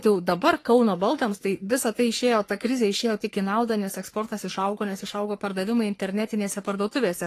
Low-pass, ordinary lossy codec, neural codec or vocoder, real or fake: 14.4 kHz; AAC, 48 kbps; codec, 44.1 kHz, 3.4 kbps, Pupu-Codec; fake